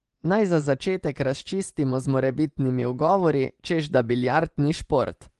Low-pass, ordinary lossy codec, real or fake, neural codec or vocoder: 10.8 kHz; Opus, 24 kbps; fake; vocoder, 24 kHz, 100 mel bands, Vocos